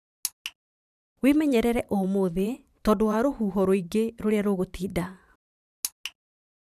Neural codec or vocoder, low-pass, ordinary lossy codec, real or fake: vocoder, 44.1 kHz, 128 mel bands every 512 samples, BigVGAN v2; 14.4 kHz; none; fake